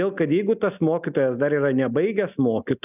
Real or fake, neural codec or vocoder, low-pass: real; none; 3.6 kHz